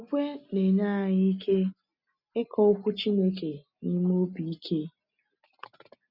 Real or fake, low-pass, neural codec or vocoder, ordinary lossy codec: real; 5.4 kHz; none; none